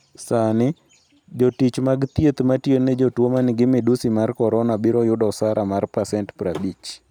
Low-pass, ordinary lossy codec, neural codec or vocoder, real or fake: 19.8 kHz; none; none; real